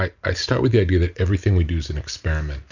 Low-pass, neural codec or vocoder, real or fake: 7.2 kHz; none; real